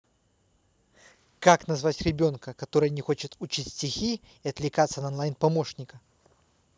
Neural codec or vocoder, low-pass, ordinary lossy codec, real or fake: none; none; none; real